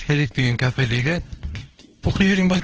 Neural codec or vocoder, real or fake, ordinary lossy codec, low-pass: codec, 24 kHz, 0.9 kbps, WavTokenizer, small release; fake; Opus, 16 kbps; 7.2 kHz